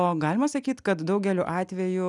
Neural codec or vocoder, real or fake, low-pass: none; real; 10.8 kHz